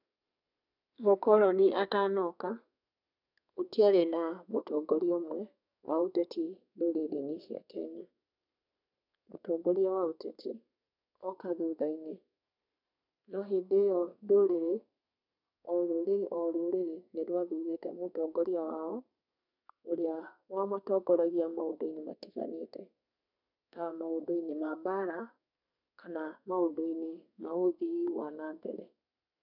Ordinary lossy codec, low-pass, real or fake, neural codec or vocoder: none; 5.4 kHz; fake; codec, 32 kHz, 1.9 kbps, SNAC